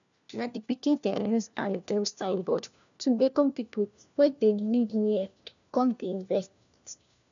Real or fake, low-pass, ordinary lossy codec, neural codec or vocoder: fake; 7.2 kHz; none; codec, 16 kHz, 1 kbps, FunCodec, trained on LibriTTS, 50 frames a second